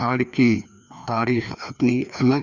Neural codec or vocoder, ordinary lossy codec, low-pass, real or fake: codec, 16 kHz, 2 kbps, FreqCodec, larger model; none; 7.2 kHz; fake